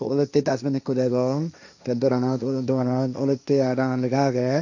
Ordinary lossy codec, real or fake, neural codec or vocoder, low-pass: none; fake; codec, 16 kHz, 1.1 kbps, Voila-Tokenizer; 7.2 kHz